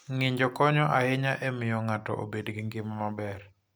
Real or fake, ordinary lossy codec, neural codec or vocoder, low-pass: real; none; none; none